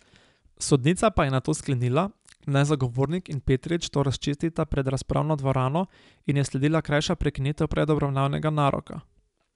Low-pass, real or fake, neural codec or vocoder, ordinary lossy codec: 10.8 kHz; real; none; MP3, 96 kbps